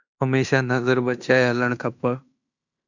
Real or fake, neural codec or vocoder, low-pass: fake; codec, 16 kHz in and 24 kHz out, 0.9 kbps, LongCat-Audio-Codec, fine tuned four codebook decoder; 7.2 kHz